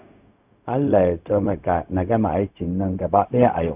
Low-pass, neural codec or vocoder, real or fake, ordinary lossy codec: 3.6 kHz; codec, 16 kHz, 0.4 kbps, LongCat-Audio-Codec; fake; none